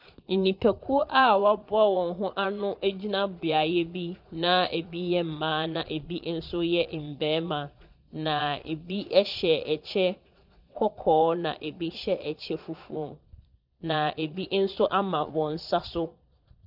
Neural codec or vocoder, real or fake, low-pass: vocoder, 22.05 kHz, 80 mel bands, Vocos; fake; 5.4 kHz